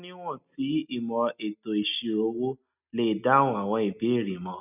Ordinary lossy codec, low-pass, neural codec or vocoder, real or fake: none; 3.6 kHz; none; real